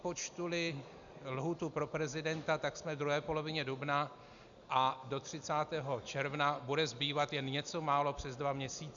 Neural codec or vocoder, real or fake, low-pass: none; real; 7.2 kHz